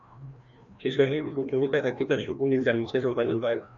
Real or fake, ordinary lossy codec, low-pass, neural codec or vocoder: fake; AAC, 64 kbps; 7.2 kHz; codec, 16 kHz, 1 kbps, FreqCodec, larger model